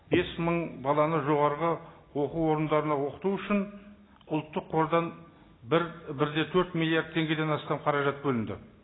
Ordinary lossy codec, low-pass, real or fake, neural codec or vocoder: AAC, 16 kbps; 7.2 kHz; real; none